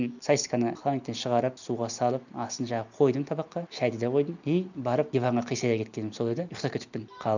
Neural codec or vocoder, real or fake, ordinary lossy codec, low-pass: none; real; none; 7.2 kHz